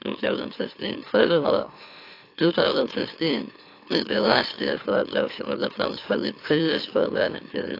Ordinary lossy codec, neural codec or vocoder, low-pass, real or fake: AAC, 32 kbps; autoencoder, 44.1 kHz, a latent of 192 numbers a frame, MeloTTS; 5.4 kHz; fake